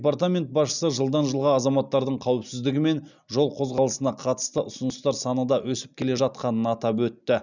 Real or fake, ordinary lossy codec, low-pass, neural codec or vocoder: real; none; 7.2 kHz; none